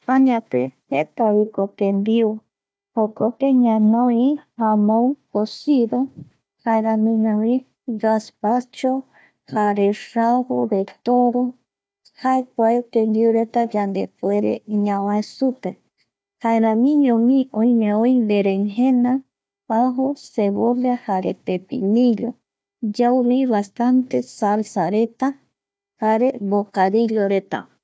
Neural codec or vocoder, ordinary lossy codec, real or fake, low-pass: codec, 16 kHz, 1 kbps, FunCodec, trained on Chinese and English, 50 frames a second; none; fake; none